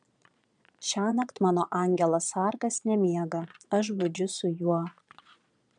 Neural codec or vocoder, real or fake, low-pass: vocoder, 22.05 kHz, 80 mel bands, Vocos; fake; 9.9 kHz